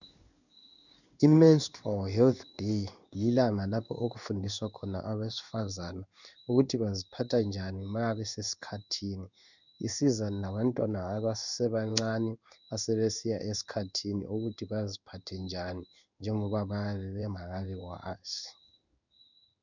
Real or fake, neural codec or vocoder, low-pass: fake; codec, 16 kHz in and 24 kHz out, 1 kbps, XY-Tokenizer; 7.2 kHz